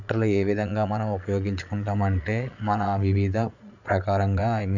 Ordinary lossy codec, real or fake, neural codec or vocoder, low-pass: none; fake; vocoder, 22.05 kHz, 80 mel bands, Vocos; 7.2 kHz